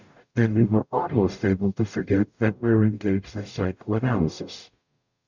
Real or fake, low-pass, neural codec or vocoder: fake; 7.2 kHz; codec, 44.1 kHz, 0.9 kbps, DAC